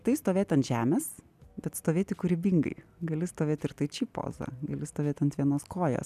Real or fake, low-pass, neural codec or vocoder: real; 14.4 kHz; none